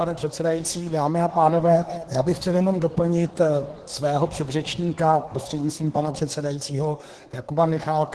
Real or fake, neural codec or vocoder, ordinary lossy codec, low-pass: fake; codec, 24 kHz, 1 kbps, SNAC; Opus, 16 kbps; 10.8 kHz